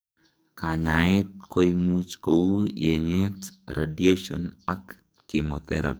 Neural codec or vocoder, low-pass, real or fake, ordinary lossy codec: codec, 44.1 kHz, 2.6 kbps, SNAC; none; fake; none